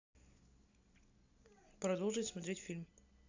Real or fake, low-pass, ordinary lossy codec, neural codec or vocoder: real; 7.2 kHz; AAC, 48 kbps; none